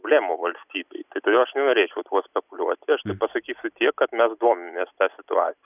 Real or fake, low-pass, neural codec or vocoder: real; 3.6 kHz; none